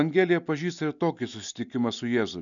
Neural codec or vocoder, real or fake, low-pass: none; real; 7.2 kHz